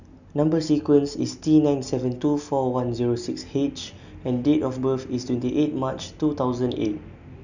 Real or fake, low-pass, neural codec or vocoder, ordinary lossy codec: real; 7.2 kHz; none; none